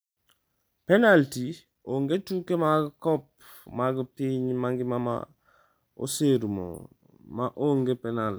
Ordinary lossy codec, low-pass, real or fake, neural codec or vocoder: none; none; real; none